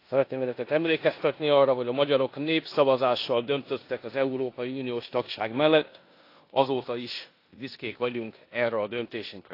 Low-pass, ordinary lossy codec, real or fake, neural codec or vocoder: 5.4 kHz; AAC, 32 kbps; fake; codec, 16 kHz in and 24 kHz out, 0.9 kbps, LongCat-Audio-Codec, four codebook decoder